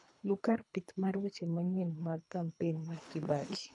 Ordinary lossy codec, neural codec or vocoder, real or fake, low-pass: none; codec, 24 kHz, 3 kbps, HILCodec; fake; 10.8 kHz